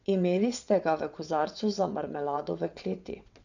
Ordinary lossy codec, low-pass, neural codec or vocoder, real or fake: none; 7.2 kHz; vocoder, 22.05 kHz, 80 mel bands, WaveNeXt; fake